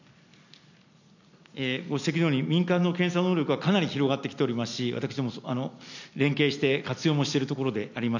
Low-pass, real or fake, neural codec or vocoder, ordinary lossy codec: 7.2 kHz; real; none; none